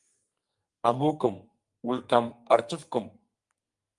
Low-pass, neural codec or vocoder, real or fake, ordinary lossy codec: 10.8 kHz; codec, 44.1 kHz, 2.6 kbps, SNAC; fake; Opus, 32 kbps